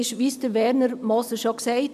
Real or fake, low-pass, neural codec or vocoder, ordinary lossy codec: real; 14.4 kHz; none; none